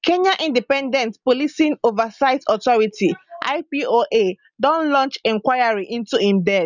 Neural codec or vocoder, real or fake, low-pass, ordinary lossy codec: none; real; 7.2 kHz; none